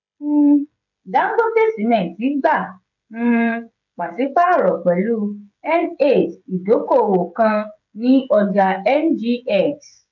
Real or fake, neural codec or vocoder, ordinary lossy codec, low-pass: fake; codec, 16 kHz, 16 kbps, FreqCodec, smaller model; none; 7.2 kHz